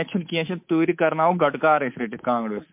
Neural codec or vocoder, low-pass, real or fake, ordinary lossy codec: codec, 24 kHz, 3.1 kbps, DualCodec; 3.6 kHz; fake; MP3, 32 kbps